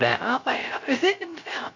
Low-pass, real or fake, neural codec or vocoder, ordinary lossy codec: 7.2 kHz; fake; codec, 16 kHz, 0.3 kbps, FocalCodec; MP3, 48 kbps